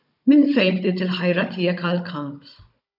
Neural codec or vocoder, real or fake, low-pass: codec, 16 kHz, 16 kbps, FunCodec, trained on Chinese and English, 50 frames a second; fake; 5.4 kHz